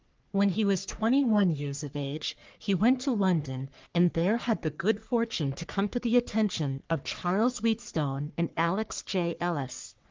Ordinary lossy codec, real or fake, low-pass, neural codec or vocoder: Opus, 24 kbps; fake; 7.2 kHz; codec, 44.1 kHz, 3.4 kbps, Pupu-Codec